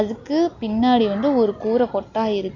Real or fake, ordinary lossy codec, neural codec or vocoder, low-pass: real; none; none; 7.2 kHz